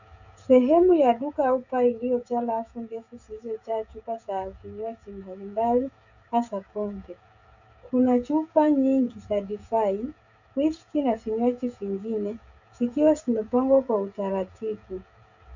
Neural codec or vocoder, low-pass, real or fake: codec, 16 kHz, 16 kbps, FreqCodec, smaller model; 7.2 kHz; fake